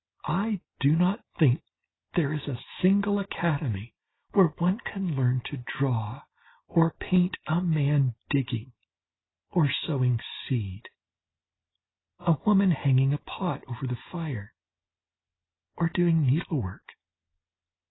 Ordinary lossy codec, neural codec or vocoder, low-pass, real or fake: AAC, 16 kbps; none; 7.2 kHz; real